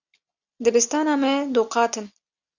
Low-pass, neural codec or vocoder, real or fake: 7.2 kHz; none; real